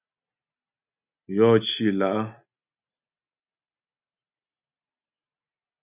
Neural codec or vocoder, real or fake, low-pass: none; real; 3.6 kHz